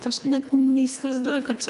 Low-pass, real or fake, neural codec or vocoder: 10.8 kHz; fake; codec, 24 kHz, 1.5 kbps, HILCodec